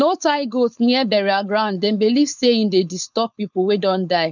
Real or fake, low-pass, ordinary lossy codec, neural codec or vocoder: fake; 7.2 kHz; none; codec, 16 kHz, 4.8 kbps, FACodec